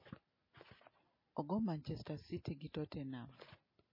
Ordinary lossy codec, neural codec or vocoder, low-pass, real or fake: MP3, 24 kbps; none; 5.4 kHz; real